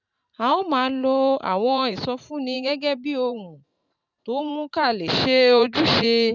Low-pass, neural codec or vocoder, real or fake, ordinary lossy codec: 7.2 kHz; vocoder, 44.1 kHz, 128 mel bands every 512 samples, BigVGAN v2; fake; none